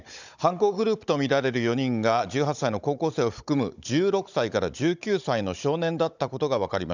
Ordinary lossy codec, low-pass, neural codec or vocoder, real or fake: none; 7.2 kHz; codec, 16 kHz, 16 kbps, FunCodec, trained on Chinese and English, 50 frames a second; fake